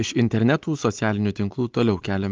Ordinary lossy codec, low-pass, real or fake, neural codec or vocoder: Opus, 32 kbps; 7.2 kHz; real; none